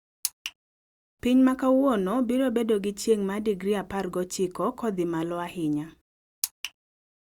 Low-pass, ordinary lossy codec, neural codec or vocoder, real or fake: 19.8 kHz; Opus, 64 kbps; none; real